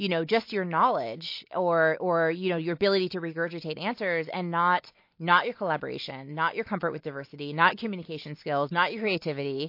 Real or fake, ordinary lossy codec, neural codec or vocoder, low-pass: real; MP3, 32 kbps; none; 5.4 kHz